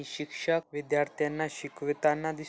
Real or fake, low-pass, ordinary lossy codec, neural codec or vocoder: real; none; none; none